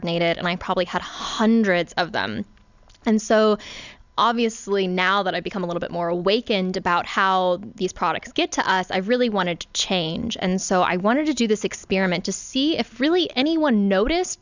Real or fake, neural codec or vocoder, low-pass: real; none; 7.2 kHz